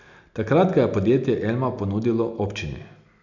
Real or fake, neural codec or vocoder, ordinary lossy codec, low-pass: real; none; none; 7.2 kHz